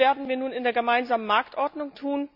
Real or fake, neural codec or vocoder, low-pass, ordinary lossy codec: real; none; 5.4 kHz; none